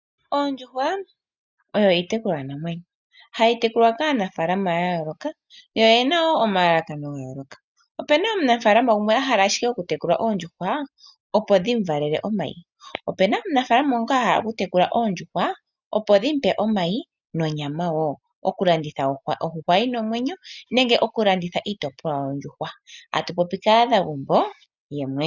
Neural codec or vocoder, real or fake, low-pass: none; real; 7.2 kHz